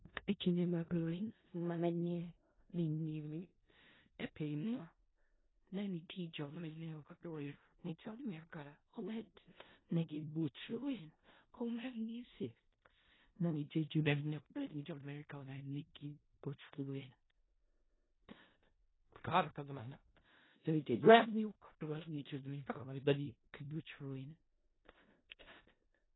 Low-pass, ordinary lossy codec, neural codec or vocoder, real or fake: 7.2 kHz; AAC, 16 kbps; codec, 16 kHz in and 24 kHz out, 0.4 kbps, LongCat-Audio-Codec, four codebook decoder; fake